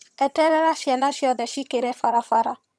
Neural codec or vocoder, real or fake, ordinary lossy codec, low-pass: vocoder, 22.05 kHz, 80 mel bands, HiFi-GAN; fake; none; none